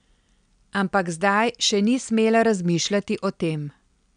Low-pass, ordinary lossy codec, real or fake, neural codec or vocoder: 9.9 kHz; none; real; none